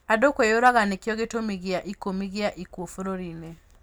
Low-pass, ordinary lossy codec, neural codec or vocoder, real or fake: none; none; none; real